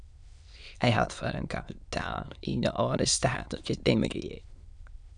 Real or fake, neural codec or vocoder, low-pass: fake; autoencoder, 22.05 kHz, a latent of 192 numbers a frame, VITS, trained on many speakers; 9.9 kHz